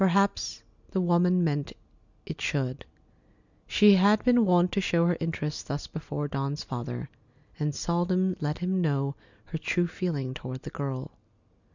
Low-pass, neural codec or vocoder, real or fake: 7.2 kHz; none; real